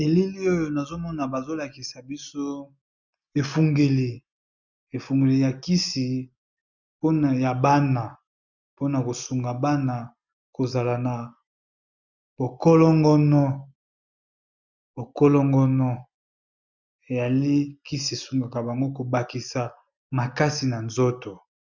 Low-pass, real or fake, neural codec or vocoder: 7.2 kHz; real; none